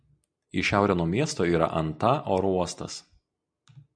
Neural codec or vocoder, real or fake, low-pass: none; real; 9.9 kHz